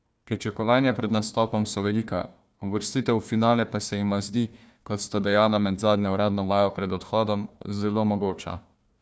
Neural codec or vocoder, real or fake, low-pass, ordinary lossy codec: codec, 16 kHz, 1 kbps, FunCodec, trained on Chinese and English, 50 frames a second; fake; none; none